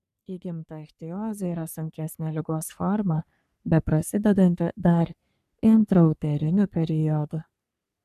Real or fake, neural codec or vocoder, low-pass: fake; codec, 44.1 kHz, 3.4 kbps, Pupu-Codec; 14.4 kHz